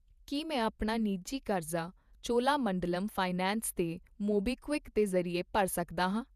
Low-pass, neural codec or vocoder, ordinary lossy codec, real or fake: 14.4 kHz; vocoder, 48 kHz, 128 mel bands, Vocos; none; fake